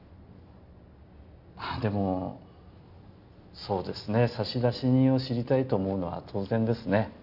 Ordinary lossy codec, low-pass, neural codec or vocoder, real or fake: none; 5.4 kHz; none; real